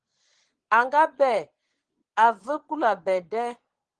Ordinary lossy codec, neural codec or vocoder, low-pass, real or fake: Opus, 16 kbps; vocoder, 22.05 kHz, 80 mel bands, Vocos; 9.9 kHz; fake